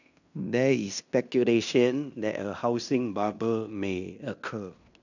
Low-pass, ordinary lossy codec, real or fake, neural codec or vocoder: 7.2 kHz; none; fake; codec, 16 kHz in and 24 kHz out, 0.9 kbps, LongCat-Audio-Codec, fine tuned four codebook decoder